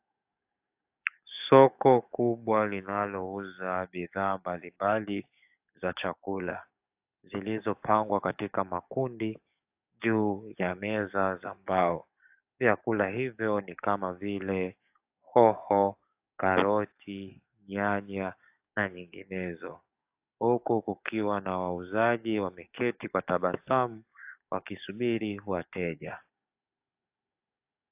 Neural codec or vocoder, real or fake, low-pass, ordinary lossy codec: codec, 44.1 kHz, 7.8 kbps, DAC; fake; 3.6 kHz; AAC, 32 kbps